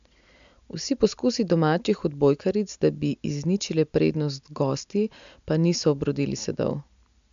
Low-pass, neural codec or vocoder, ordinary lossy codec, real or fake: 7.2 kHz; none; MP3, 64 kbps; real